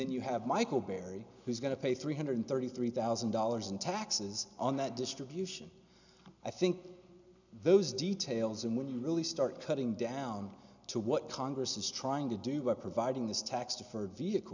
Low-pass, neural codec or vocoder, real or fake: 7.2 kHz; none; real